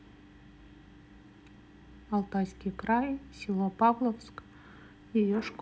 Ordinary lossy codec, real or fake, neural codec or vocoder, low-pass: none; real; none; none